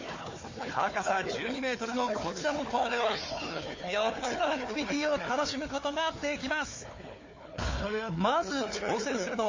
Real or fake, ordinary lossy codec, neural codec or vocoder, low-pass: fake; MP3, 32 kbps; codec, 16 kHz, 4 kbps, FunCodec, trained on Chinese and English, 50 frames a second; 7.2 kHz